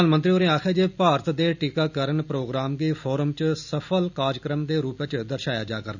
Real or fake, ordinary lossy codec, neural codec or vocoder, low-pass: real; none; none; 7.2 kHz